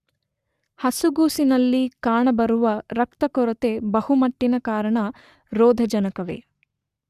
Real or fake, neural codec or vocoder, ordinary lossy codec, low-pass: fake; codec, 44.1 kHz, 7.8 kbps, Pupu-Codec; Opus, 64 kbps; 14.4 kHz